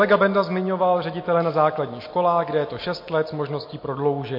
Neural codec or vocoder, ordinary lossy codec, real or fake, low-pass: none; MP3, 32 kbps; real; 5.4 kHz